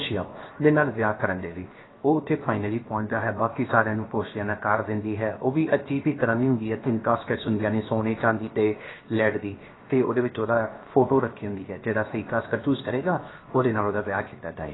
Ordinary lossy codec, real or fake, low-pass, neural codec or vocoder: AAC, 16 kbps; fake; 7.2 kHz; codec, 16 kHz, 0.7 kbps, FocalCodec